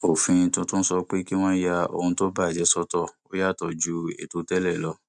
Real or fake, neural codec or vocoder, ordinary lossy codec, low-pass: real; none; none; 10.8 kHz